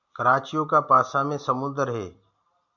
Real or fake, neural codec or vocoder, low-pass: real; none; 7.2 kHz